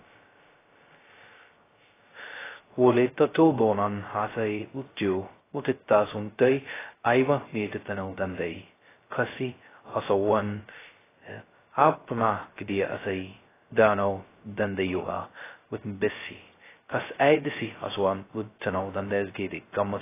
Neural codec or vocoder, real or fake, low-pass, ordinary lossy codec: codec, 16 kHz, 0.2 kbps, FocalCodec; fake; 3.6 kHz; AAC, 16 kbps